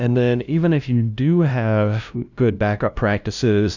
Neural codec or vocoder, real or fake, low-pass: codec, 16 kHz, 0.5 kbps, FunCodec, trained on LibriTTS, 25 frames a second; fake; 7.2 kHz